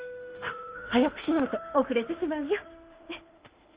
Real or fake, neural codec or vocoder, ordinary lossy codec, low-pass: fake; autoencoder, 48 kHz, 32 numbers a frame, DAC-VAE, trained on Japanese speech; Opus, 24 kbps; 3.6 kHz